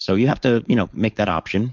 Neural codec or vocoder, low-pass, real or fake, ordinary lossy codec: codec, 16 kHz, 4.8 kbps, FACodec; 7.2 kHz; fake; MP3, 64 kbps